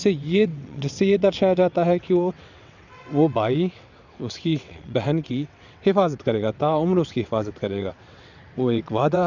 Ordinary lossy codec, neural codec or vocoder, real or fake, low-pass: none; vocoder, 22.05 kHz, 80 mel bands, WaveNeXt; fake; 7.2 kHz